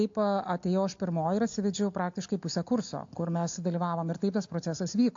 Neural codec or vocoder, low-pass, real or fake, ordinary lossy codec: none; 7.2 kHz; real; AAC, 48 kbps